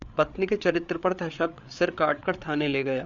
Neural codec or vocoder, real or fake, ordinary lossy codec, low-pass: codec, 16 kHz, 8 kbps, FreqCodec, larger model; fake; Opus, 64 kbps; 7.2 kHz